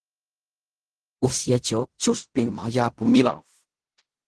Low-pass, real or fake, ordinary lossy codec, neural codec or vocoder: 10.8 kHz; fake; Opus, 16 kbps; codec, 16 kHz in and 24 kHz out, 0.4 kbps, LongCat-Audio-Codec, fine tuned four codebook decoder